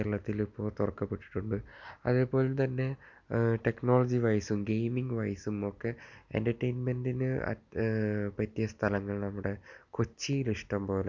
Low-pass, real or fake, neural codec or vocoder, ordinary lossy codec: 7.2 kHz; real; none; none